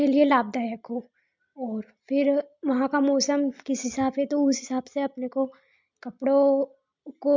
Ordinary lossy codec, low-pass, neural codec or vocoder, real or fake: MP3, 64 kbps; 7.2 kHz; none; real